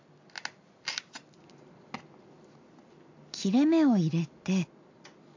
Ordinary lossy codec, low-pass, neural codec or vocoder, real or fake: AAC, 48 kbps; 7.2 kHz; none; real